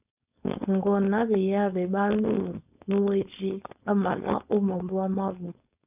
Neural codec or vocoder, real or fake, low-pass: codec, 16 kHz, 4.8 kbps, FACodec; fake; 3.6 kHz